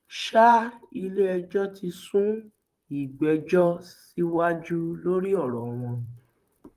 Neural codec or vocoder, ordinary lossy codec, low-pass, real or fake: vocoder, 44.1 kHz, 128 mel bands, Pupu-Vocoder; Opus, 32 kbps; 14.4 kHz; fake